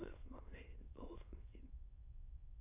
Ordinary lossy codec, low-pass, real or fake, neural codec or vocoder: MP3, 24 kbps; 3.6 kHz; fake; autoencoder, 22.05 kHz, a latent of 192 numbers a frame, VITS, trained on many speakers